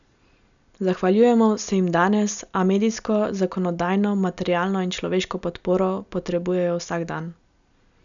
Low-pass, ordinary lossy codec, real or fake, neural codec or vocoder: 7.2 kHz; none; real; none